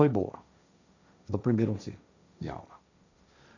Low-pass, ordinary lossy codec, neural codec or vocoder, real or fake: 7.2 kHz; none; codec, 16 kHz, 1.1 kbps, Voila-Tokenizer; fake